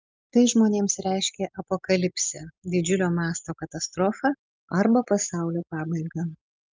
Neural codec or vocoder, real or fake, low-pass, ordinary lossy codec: none; real; 7.2 kHz; Opus, 24 kbps